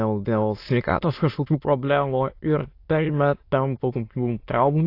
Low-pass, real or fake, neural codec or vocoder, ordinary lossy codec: 5.4 kHz; fake; autoencoder, 22.05 kHz, a latent of 192 numbers a frame, VITS, trained on many speakers; AAC, 32 kbps